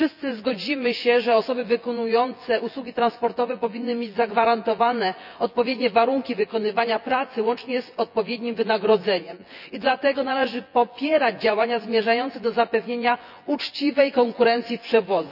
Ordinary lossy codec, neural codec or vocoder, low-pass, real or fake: none; vocoder, 24 kHz, 100 mel bands, Vocos; 5.4 kHz; fake